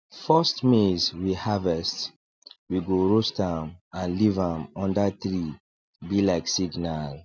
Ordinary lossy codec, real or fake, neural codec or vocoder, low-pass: none; real; none; none